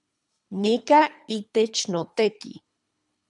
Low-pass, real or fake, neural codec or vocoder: 10.8 kHz; fake; codec, 24 kHz, 3 kbps, HILCodec